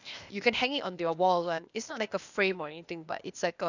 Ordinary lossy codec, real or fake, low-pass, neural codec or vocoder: none; fake; 7.2 kHz; codec, 16 kHz, 0.8 kbps, ZipCodec